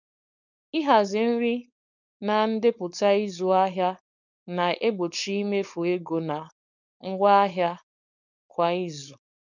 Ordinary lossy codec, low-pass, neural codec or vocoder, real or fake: none; 7.2 kHz; codec, 16 kHz, 4.8 kbps, FACodec; fake